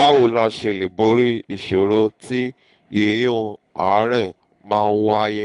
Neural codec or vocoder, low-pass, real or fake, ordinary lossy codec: codec, 24 kHz, 3 kbps, HILCodec; 10.8 kHz; fake; none